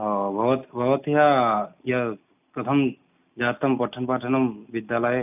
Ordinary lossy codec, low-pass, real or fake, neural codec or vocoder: none; 3.6 kHz; real; none